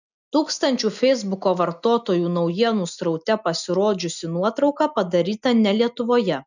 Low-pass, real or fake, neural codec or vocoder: 7.2 kHz; real; none